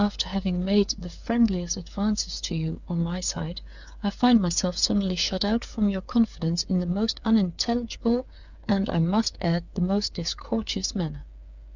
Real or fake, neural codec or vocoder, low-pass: fake; codec, 16 kHz, 8 kbps, FreqCodec, smaller model; 7.2 kHz